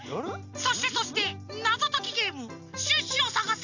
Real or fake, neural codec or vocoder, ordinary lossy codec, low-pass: real; none; none; 7.2 kHz